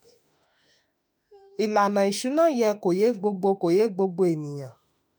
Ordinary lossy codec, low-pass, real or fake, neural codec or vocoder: none; none; fake; autoencoder, 48 kHz, 32 numbers a frame, DAC-VAE, trained on Japanese speech